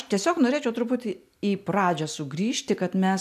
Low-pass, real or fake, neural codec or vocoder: 14.4 kHz; real; none